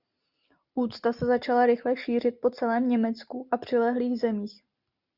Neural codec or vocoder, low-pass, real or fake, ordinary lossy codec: none; 5.4 kHz; real; Opus, 64 kbps